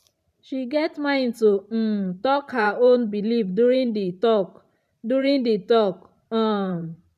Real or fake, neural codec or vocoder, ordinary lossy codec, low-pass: fake; vocoder, 44.1 kHz, 128 mel bands every 512 samples, BigVGAN v2; none; 14.4 kHz